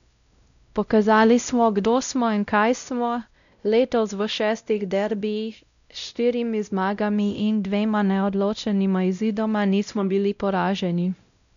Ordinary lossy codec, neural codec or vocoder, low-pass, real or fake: none; codec, 16 kHz, 0.5 kbps, X-Codec, WavLM features, trained on Multilingual LibriSpeech; 7.2 kHz; fake